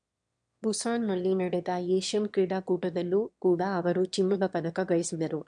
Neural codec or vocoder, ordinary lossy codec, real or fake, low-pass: autoencoder, 22.05 kHz, a latent of 192 numbers a frame, VITS, trained on one speaker; MP3, 64 kbps; fake; 9.9 kHz